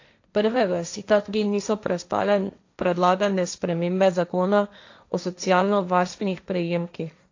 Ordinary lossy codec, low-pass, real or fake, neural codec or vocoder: AAC, 48 kbps; 7.2 kHz; fake; codec, 16 kHz, 1.1 kbps, Voila-Tokenizer